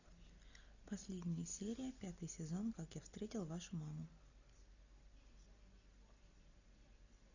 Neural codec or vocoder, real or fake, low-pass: none; real; 7.2 kHz